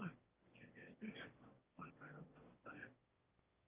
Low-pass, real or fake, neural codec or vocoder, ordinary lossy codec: 3.6 kHz; fake; autoencoder, 22.05 kHz, a latent of 192 numbers a frame, VITS, trained on one speaker; Opus, 24 kbps